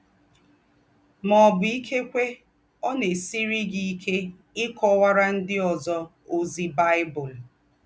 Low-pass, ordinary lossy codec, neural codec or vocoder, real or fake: none; none; none; real